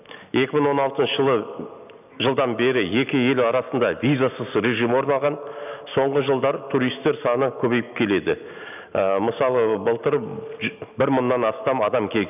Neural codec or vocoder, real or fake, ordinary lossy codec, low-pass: none; real; none; 3.6 kHz